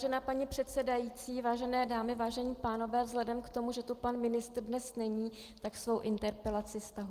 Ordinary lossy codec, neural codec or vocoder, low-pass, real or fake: Opus, 24 kbps; none; 14.4 kHz; real